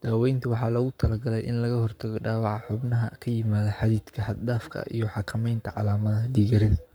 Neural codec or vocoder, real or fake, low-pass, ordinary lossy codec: codec, 44.1 kHz, 7.8 kbps, Pupu-Codec; fake; none; none